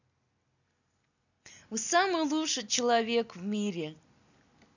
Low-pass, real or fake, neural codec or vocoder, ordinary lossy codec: 7.2 kHz; real; none; none